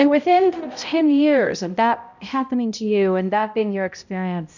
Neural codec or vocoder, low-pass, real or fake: codec, 16 kHz, 0.5 kbps, X-Codec, HuBERT features, trained on balanced general audio; 7.2 kHz; fake